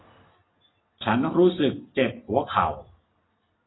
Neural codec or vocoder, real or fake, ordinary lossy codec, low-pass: none; real; AAC, 16 kbps; 7.2 kHz